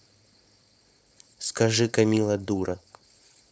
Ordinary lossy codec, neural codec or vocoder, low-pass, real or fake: none; codec, 16 kHz, 4.8 kbps, FACodec; none; fake